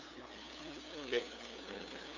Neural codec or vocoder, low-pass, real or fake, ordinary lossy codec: codec, 16 kHz, 2 kbps, FunCodec, trained on LibriTTS, 25 frames a second; 7.2 kHz; fake; none